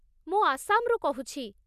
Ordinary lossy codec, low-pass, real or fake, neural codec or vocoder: none; 14.4 kHz; real; none